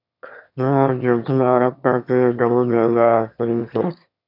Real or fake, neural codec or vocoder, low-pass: fake; autoencoder, 22.05 kHz, a latent of 192 numbers a frame, VITS, trained on one speaker; 5.4 kHz